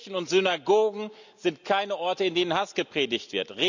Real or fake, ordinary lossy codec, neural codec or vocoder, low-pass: real; none; none; 7.2 kHz